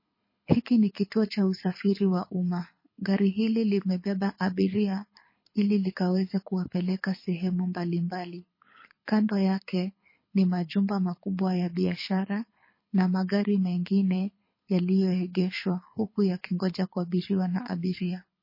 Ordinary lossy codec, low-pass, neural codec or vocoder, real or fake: MP3, 24 kbps; 5.4 kHz; codec, 24 kHz, 6 kbps, HILCodec; fake